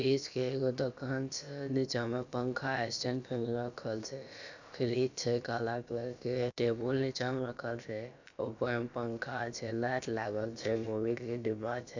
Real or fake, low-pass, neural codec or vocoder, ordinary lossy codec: fake; 7.2 kHz; codec, 16 kHz, about 1 kbps, DyCAST, with the encoder's durations; none